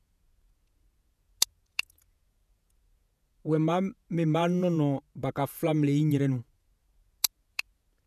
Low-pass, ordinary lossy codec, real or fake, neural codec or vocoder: 14.4 kHz; none; fake; vocoder, 48 kHz, 128 mel bands, Vocos